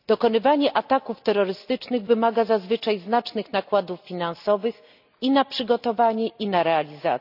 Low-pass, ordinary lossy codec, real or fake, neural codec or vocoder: 5.4 kHz; none; real; none